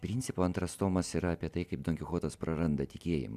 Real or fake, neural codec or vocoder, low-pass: real; none; 14.4 kHz